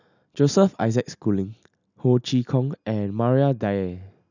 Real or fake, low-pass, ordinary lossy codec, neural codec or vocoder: real; 7.2 kHz; none; none